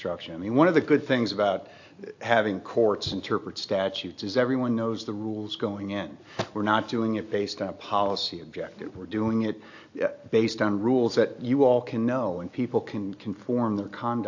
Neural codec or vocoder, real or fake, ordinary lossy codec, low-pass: none; real; AAC, 48 kbps; 7.2 kHz